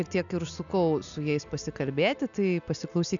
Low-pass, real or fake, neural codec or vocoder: 7.2 kHz; real; none